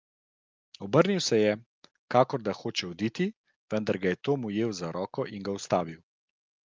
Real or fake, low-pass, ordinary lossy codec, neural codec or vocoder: real; 7.2 kHz; Opus, 24 kbps; none